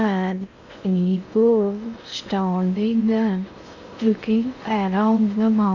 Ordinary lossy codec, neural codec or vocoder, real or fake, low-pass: none; codec, 16 kHz in and 24 kHz out, 0.6 kbps, FocalCodec, streaming, 2048 codes; fake; 7.2 kHz